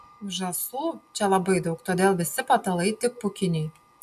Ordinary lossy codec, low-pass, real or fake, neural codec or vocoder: AAC, 96 kbps; 14.4 kHz; real; none